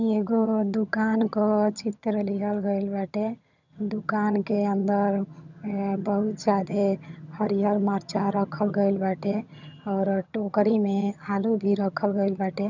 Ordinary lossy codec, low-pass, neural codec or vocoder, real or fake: none; 7.2 kHz; vocoder, 22.05 kHz, 80 mel bands, HiFi-GAN; fake